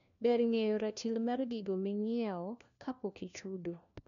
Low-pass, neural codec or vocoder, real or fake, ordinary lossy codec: 7.2 kHz; codec, 16 kHz, 1 kbps, FunCodec, trained on LibriTTS, 50 frames a second; fake; none